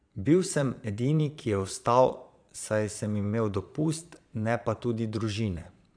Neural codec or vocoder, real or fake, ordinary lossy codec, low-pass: vocoder, 22.05 kHz, 80 mel bands, Vocos; fake; none; 9.9 kHz